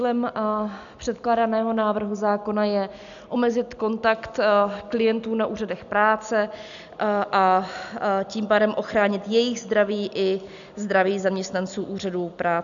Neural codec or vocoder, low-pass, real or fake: none; 7.2 kHz; real